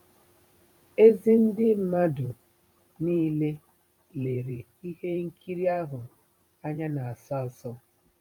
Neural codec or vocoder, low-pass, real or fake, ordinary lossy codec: vocoder, 44.1 kHz, 128 mel bands every 512 samples, BigVGAN v2; 19.8 kHz; fake; none